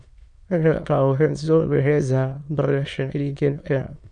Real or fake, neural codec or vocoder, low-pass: fake; autoencoder, 22.05 kHz, a latent of 192 numbers a frame, VITS, trained on many speakers; 9.9 kHz